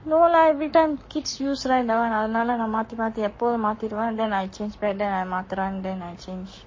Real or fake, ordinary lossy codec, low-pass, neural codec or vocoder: fake; MP3, 32 kbps; 7.2 kHz; codec, 44.1 kHz, 7.8 kbps, Pupu-Codec